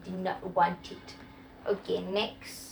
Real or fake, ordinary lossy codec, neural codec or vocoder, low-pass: fake; none; vocoder, 44.1 kHz, 128 mel bands every 512 samples, BigVGAN v2; none